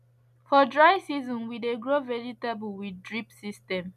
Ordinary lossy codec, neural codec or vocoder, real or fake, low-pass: none; none; real; 14.4 kHz